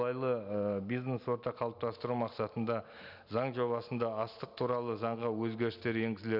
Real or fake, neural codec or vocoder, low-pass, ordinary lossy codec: real; none; 5.4 kHz; none